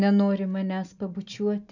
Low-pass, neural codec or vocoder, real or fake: 7.2 kHz; none; real